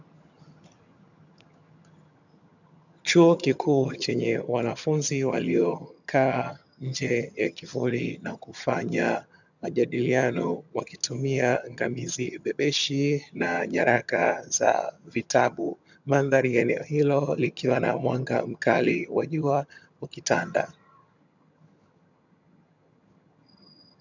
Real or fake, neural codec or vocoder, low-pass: fake; vocoder, 22.05 kHz, 80 mel bands, HiFi-GAN; 7.2 kHz